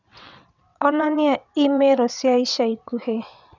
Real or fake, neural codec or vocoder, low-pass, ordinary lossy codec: fake; vocoder, 22.05 kHz, 80 mel bands, WaveNeXt; 7.2 kHz; none